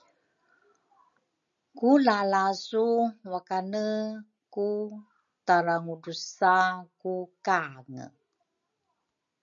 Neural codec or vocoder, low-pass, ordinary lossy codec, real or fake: none; 7.2 kHz; MP3, 64 kbps; real